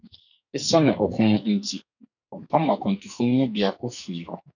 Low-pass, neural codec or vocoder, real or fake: 7.2 kHz; codec, 44.1 kHz, 2.6 kbps, SNAC; fake